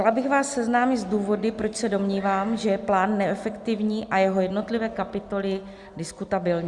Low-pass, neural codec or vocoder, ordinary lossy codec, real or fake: 10.8 kHz; none; Opus, 64 kbps; real